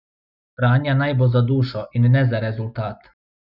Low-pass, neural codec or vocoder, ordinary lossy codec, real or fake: 5.4 kHz; none; Opus, 64 kbps; real